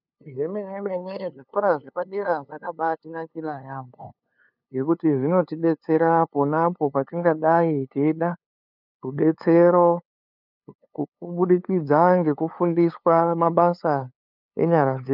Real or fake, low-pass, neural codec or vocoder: fake; 5.4 kHz; codec, 16 kHz, 2 kbps, FunCodec, trained on LibriTTS, 25 frames a second